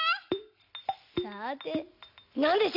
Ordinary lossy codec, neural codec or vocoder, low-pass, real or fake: none; none; 5.4 kHz; real